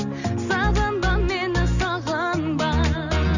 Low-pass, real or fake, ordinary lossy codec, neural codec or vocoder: 7.2 kHz; real; none; none